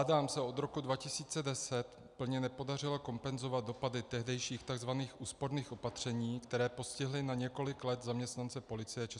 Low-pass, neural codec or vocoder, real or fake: 10.8 kHz; none; real